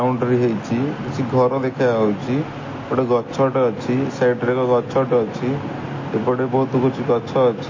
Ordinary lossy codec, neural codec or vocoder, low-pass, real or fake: MP3, 32 kbps; none; 7.2 kHz; real